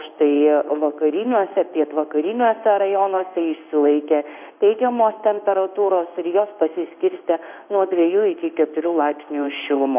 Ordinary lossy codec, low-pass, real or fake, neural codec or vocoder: MP3, 32 kbps; 3.6 kHz; fake; codec, 16 kHz in and 24 kHz out, 1 kbps, XY-Tokenizer